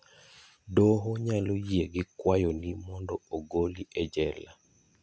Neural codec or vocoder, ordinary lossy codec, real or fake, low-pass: none; none; real; none